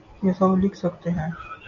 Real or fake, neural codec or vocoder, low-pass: real; none; 7.2 kHz